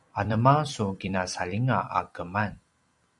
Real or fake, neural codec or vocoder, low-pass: fake; vocoder, 44.1 kHz, 128 mel bands every 256 samples, BigVGAN v2; 10.8 kHz